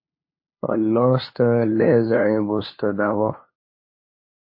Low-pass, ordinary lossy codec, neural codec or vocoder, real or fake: 5.4 kHz; MP3, 24 kbps; codec, 16 kHz, 2 kbps, FunCodec, trained on LibriTTS, 25 frames a second; fake